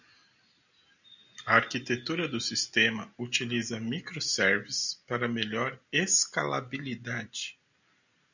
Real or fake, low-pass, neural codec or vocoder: real; 7.2 kHz; none